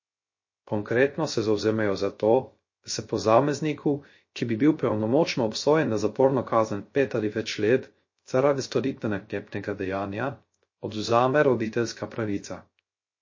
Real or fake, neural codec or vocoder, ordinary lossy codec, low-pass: fake; codec, 16 kHz, 0.3 kbps, FocalCodec; MP3, 32 kbps; 7.2 kHz